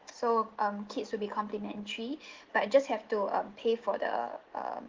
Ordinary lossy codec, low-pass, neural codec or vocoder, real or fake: Opus, 16 kbps; 7.2 kHz; none; real